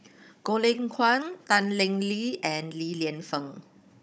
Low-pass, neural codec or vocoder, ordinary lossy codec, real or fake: none; codec, 16 kHz, 16 kbps, FunCodec, trained on Chinese and English, 50 frames a second; none; fake